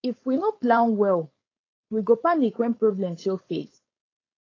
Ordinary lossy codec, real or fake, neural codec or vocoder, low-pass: AAC, 32 kbps; fake; codec, 16 kHz, 4.8 kbps, FACodec; 7.2 kHz